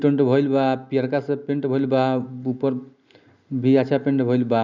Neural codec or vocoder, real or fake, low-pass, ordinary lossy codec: none; real; 7.2 kHz; none